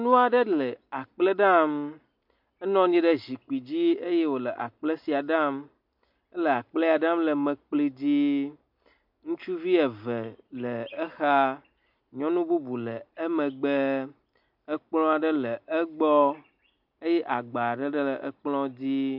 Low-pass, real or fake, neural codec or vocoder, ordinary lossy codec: 5.4 kHz; real; none; MP3, 48 kbps